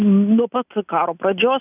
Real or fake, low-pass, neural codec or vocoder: real; 3.6 kHz; none